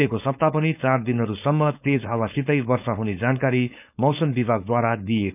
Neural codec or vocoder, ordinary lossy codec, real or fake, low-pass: codec, 16 kHz, 4.8 kbps, FACodec; none; fake; 3.6 kHz